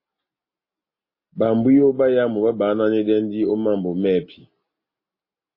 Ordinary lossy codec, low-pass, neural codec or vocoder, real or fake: MP3, 24 kbps; 5.4 kHz; none; real